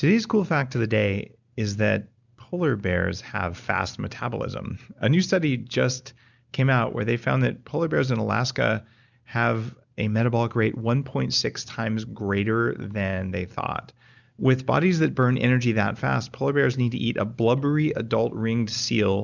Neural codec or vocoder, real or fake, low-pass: none; real; 7.2 kHz